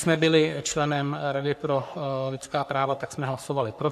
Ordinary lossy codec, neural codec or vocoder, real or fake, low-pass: AAC, 96 kbps; codec, 44.1 kHz, 3.4 kbps, Pupu-Codec; fake; 14.4 kHz